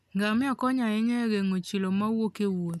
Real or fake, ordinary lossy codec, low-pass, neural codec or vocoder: real; none; 14.4 kHz; none